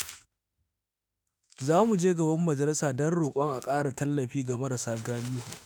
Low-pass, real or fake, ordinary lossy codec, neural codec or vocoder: none; fake; none; autoencoder, 48 kHz, 32 numbers a frame, DAC-VAE, trained on Japanese speech